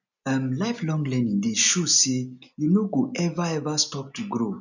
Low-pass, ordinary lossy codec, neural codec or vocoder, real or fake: 7.2 kHz; none; none; real